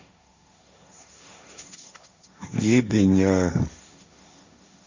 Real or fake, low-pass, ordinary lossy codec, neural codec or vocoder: fake; 7.2 kHz; Opus, 64 kbps; codec, 16 kHz, 1.1 kbps, Voila-Tokenizer